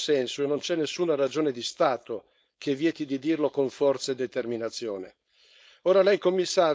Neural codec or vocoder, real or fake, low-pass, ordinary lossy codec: codec, 16 kHz, 4.8 kbps, FACodec; fake; none; none